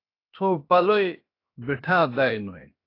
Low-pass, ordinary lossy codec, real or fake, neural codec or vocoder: 5.4 kHz; AAC, 32 kbps; fake; codec, 16 kHz, 0.7 kbps, FocalCodec